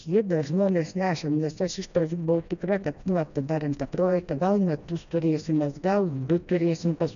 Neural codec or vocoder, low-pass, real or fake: codec, 16 kHz, 1 kbps, FreqCodec, smaller model; 7.2 kHz; fake